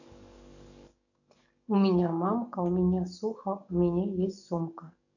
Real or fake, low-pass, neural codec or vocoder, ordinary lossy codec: fake; 7.2 kHz; codec, 44.1 kHz, 7.8 kbps, DAC; none